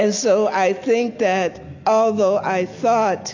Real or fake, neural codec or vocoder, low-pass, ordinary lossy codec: real; none; 7.2 kHz; AAC, 48 kbps